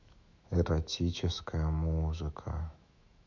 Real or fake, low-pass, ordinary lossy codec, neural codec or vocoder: fake; 7.2 kHz; MP3, 64 kbps; autoencoder, 48 kHz, 128 numbers a frame, DAC-VAE, trained on Japanese speech